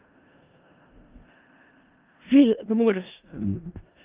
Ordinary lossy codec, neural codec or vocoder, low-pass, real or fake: Opus, 32 kbps; codec, 16 kHz in and 24 kHz out, 0.4 kbps, LongCat-Audio-Codec, four codebook decoder; 3.6 kHz; fake